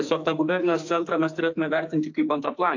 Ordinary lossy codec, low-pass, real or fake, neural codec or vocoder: AAC, 48 kbps; 7.2 kHz; fake; codec, 44.1 kHz, 2.6 kbps, SNAC